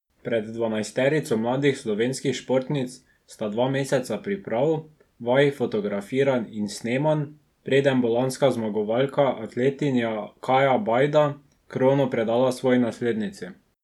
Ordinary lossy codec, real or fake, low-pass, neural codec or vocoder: none; real; 19.8 kHz; none